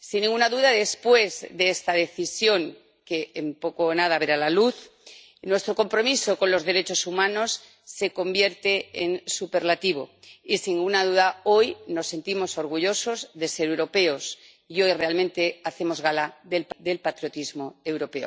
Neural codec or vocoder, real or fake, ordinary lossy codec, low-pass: none; real; none; none